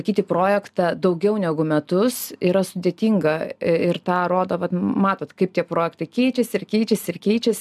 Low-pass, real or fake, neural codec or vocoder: 14.4 kHz; real; none